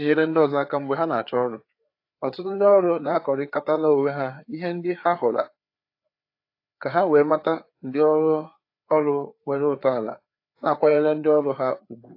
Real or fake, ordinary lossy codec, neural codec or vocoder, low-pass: fake; AAC, 32 kbps; codec, 16 kHz, 4 kbps, FreqCodec, larger model; 5.4 kHz